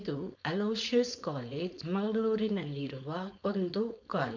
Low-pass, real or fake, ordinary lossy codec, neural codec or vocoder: 7.2 kHz; fake; MP3, 64 kbps; codec, 16 kHz, 4.8 kbps, FACodec